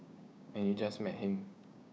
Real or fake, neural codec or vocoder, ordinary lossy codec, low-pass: fake; codec, 16 kHz, 6 kbps, DAC; none; none